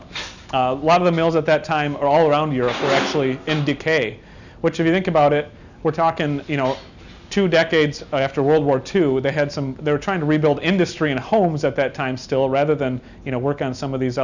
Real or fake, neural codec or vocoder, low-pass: real; none; 7.2 kHz